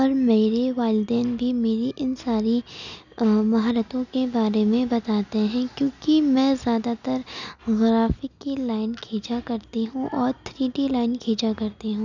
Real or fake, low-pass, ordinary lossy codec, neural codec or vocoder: real; 7.2 kHz; none; none